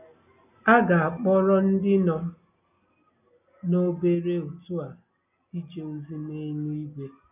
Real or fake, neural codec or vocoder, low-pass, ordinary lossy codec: real; none; 3.6 kHz; none